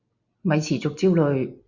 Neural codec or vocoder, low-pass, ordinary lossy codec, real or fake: none; 7.2 kHz; Opus, 64 kbps; real